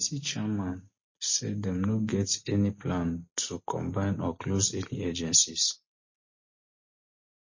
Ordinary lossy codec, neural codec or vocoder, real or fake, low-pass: MP3, 32 kbps; none; real; 7.2 kHz